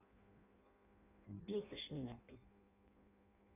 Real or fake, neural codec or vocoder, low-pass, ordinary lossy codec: fake; codec, 16 kHz in and 24 kHz out, 0.6 kbps, FireRedTTS-2 codec; 3.6 kHz; none